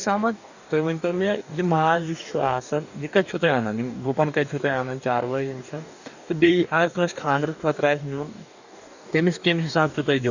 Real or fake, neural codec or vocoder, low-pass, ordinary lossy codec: fake; codec, 44.1 kHz, 2.6 kbps, DAC; 7.2 kHz; none